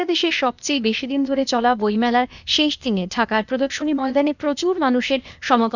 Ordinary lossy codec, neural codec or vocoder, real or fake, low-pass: none; codec, 16 kHz, 0.8 kbps, ZipCodec; fake; 7.2 kHz